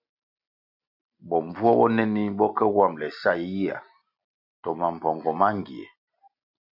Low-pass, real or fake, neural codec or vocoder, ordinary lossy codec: 5.4 kHz; real; none; MP3, 48 kbps